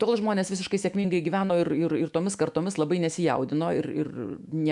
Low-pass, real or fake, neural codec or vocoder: 10.8 kHz; fake; autoencoder, 48 kHz, 128 numbers a frame, DAC-VAE, trained on Japanese speech